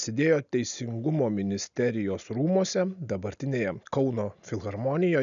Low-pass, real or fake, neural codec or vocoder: 7.2 kHz; real; none